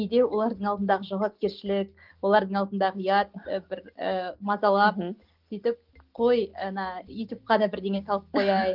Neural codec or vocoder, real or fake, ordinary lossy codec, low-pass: none; real; Opus, 32 kbps; 5.4 kHz